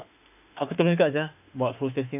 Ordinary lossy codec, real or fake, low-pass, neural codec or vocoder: none; fake; 3.6 kHz; autoencoder, 48 kHz, 32 numbers a frame, DAC-VAE, trained on Japanese speech